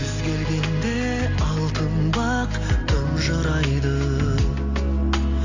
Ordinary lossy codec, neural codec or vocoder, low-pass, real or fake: AAC, 48 kbps; none; 7.2 kHz; real